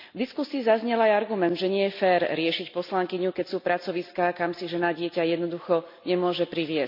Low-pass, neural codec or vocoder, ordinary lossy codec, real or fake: 5.4 kHz; none; MP3, 48 kbps; real